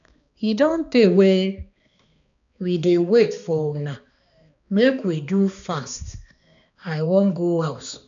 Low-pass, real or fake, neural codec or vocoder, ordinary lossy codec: 7.2 kHz; fake; codec, 16 kHz, 2 kbps, X-Codec, HuBERT features, trained on balanced general audio; none